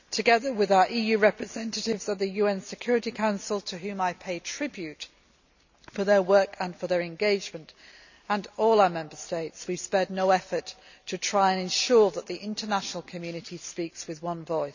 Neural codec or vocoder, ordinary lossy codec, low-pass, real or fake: none; none; 7.2 kHz; real